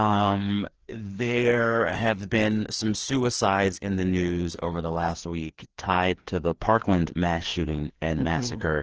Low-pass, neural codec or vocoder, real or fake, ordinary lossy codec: 7.2 kHz; codec, 16 kHz, 2 kbps, FreqCodec, larger model; fake; Opus, 16 kbps